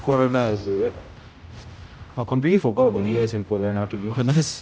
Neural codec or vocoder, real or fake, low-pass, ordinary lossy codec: codec, 16 kHz, 0.5 kbps, X-Codec, HuBERT features, trained on general audio; fake; none; none